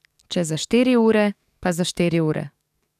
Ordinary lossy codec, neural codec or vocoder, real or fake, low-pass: none; codec, 44.1 kHz, 7.8 kbps, DAC; fake; 14.4 kHz